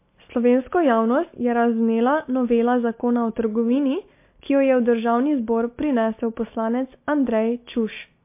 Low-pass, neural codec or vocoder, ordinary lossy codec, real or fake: 3.6 kHz; none; MP3, 24 kbps; real